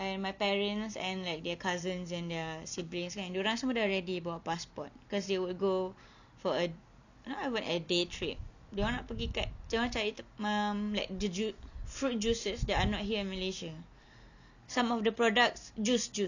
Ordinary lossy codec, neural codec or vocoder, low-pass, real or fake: none; none; 7.2 kHz; real